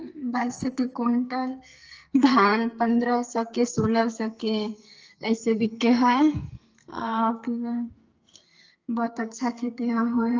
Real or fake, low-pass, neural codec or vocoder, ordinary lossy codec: fake; 7.2 kHz; codec, 44.1 kHz, 2.6 kbps, SNAC; Opus, 24 kbps